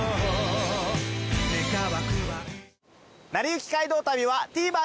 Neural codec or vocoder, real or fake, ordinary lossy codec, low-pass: none; real; none; none